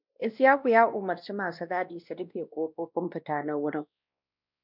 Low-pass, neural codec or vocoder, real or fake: 5.4 kHz; codec, 16 kHz, 1 kbps, X-Codec, WavLM features, trained on Multilingual LibriSpeech; fake